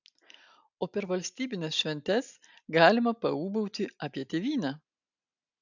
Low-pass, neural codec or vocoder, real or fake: 7.2 kHz; none; real